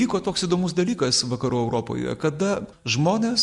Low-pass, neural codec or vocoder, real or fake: 10.8 kHz; none; real